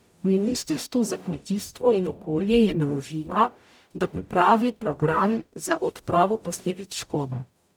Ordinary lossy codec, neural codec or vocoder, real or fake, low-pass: none; codec, 44.1 kHz, 0.9 kbps, DAC; fake; none